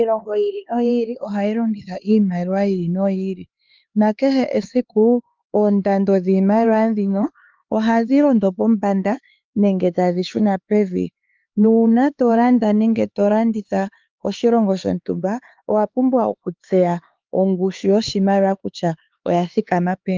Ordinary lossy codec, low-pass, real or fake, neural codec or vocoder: Opus, 32 kbps; 7.2 kHz; fake; codec, 16 kHz, 4 kbps, X-Codec, HuBERT features, trained on LibriSpeech